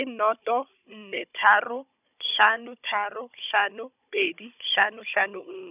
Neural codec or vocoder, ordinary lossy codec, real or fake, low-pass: codec, 16 kHz, 16 kbps, FunCodec, trained on Chinese and English, 50 frames a second; none; fake; 3.6 kHz